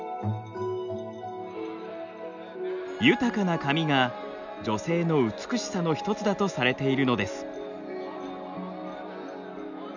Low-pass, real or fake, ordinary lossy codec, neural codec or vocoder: 7.2 kHz; real; none; none